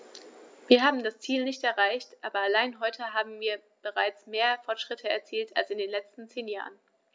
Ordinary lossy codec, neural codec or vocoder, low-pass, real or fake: none; none; none; real